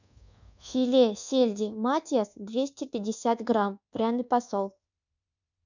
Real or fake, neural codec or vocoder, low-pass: fake; codec, 24 kHz, 1.2 kbps, DualCodec; 7.2 kHz